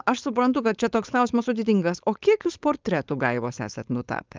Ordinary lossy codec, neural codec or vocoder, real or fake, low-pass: Opus, 24 kbps; codec, 16 kHz, 4.8 kbps, FACodec; fake; 7.2 kHz